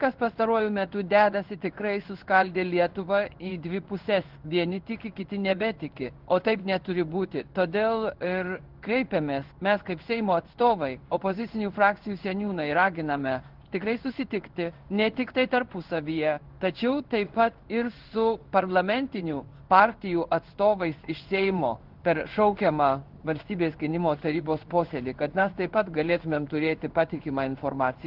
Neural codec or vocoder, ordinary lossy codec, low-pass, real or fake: codec, 16 kHz in and 24 kHz out, 1 kbps, XY-Tokenizer; Opus, 16 kbps; 5.4 kHz; fake